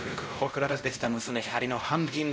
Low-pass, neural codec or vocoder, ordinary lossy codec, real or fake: none; codec, 16 kHz, 0.5 kbps, X-Codec, WavLM features, trained on Multilingual LibriSpeech; none; fake